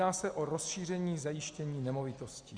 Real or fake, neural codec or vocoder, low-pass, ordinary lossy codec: real; none; 9.9 kHz; Opus, 64 kbps